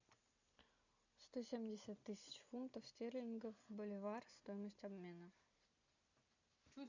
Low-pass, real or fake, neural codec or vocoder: 7.2 kHz; real; none